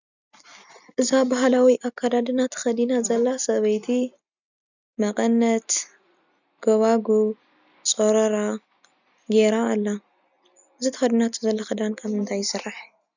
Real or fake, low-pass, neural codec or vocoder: real; 7.2 kHz; none